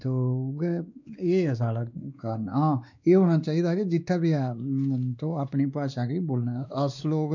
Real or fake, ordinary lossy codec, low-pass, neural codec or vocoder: fake; none; 7.2 kHz; codec, 16 kHz, 2 kbps, X-Codec, WavLM features, trained on Multilingual LibriSpeech